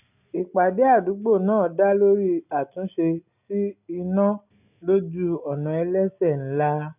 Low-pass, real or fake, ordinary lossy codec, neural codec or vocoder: 3.6 kHz; real; none; none